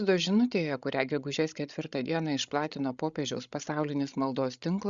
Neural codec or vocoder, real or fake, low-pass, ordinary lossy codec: codec, 16 kHz, 16 kbps, FreqCodec, larger model; fake; 7.2 kHz; Opus, 64 kbps